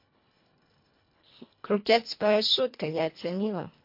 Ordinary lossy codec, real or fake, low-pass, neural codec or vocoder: MP3, 32 kbps; fake; 5.4 kHz; codec, 24 kHz, 1.5 kbps, HILCodec